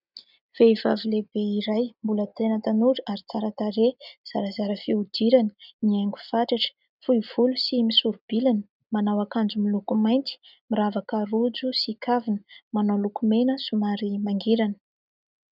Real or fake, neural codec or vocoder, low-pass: real; none; 5.4 kHz